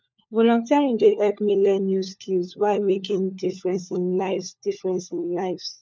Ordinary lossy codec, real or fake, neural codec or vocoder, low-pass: none; fake; codec, 16 kHz, 4 kbps, FunCodec, trained on LibriTTS, 50 frames a second; none